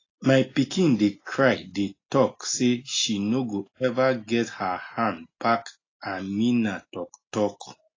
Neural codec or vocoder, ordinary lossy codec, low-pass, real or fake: none; AAC, 32 kbps; 7.2 kHz; real